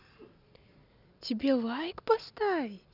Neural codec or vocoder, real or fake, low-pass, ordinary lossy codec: none; real; 5.4 kHz; none